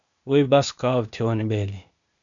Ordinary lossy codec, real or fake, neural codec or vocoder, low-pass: MP3, 96 kbps; fake; codec, 16 kHz, 0.8 kbps, ZipCodec; 7.2 kHz